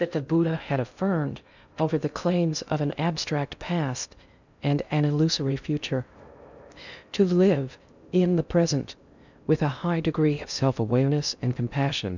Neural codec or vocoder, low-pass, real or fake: codec, 16 kHz in and 24 kHz out, 0.6 kbps, FocalCodec, streaming, 2048 codes; 7.2 kHz; fake